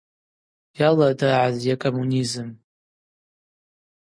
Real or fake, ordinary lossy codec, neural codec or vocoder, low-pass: real; MP3, 48 kbps; none; 9.9 kHz